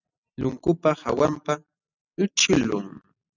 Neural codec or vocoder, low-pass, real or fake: none; 7.2 kHz; real